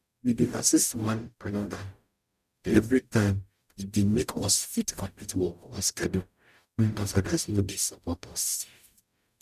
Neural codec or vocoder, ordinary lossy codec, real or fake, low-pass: codec, 44.1 kHz, 0.9 kbps, DAC; none; fake; 14.4 kHz